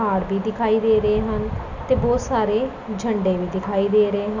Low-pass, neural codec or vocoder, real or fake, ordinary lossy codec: 7.2 kHz; none; real; none